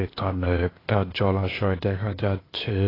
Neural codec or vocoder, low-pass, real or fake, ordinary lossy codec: codec, 16 kHz, 0.8 kbps, ZipCodec; 5.4 kHz; fake; AAC, 24 kbps